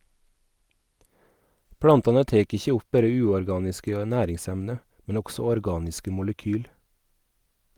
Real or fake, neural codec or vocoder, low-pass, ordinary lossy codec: real; none; 19.8 kHz; Opus, 32 kbps